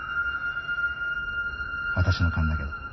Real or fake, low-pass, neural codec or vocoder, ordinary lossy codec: real; 7.2 kHz; none; MP3, 24 kbps